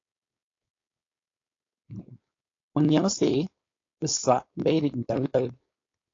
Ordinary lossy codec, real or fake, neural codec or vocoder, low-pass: AAC, 32 kbps; fake; codec, 16 kHz, 4.8 kbps, FACodec; 7.2 kHz